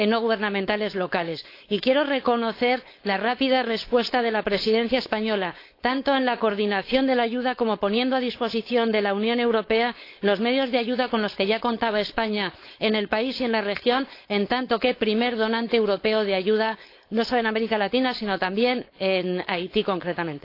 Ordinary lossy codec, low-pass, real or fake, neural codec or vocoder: AAC, 32 kbps; 5.4 kHz; fake; codec, 16 kHz, 4.8 kbps, FACodec